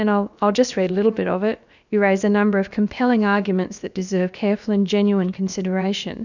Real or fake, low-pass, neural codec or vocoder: fake; 7.2 kHz; codec, 16 kHz, about 1 kbps, DyCAST, with the encoder's durations